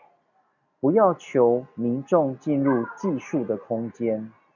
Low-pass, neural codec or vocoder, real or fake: 7.2 kHz; none; real